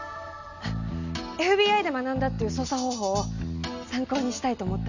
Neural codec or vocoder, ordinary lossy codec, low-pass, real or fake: none; none; 7.2 kHz; real